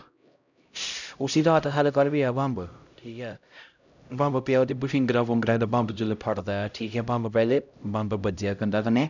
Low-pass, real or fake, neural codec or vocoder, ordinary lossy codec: 7.2 kHz; fake; codec, 16 kHz, 0.5 kbps, X-Codec, HuBERT features, trained on LibriSpeech; none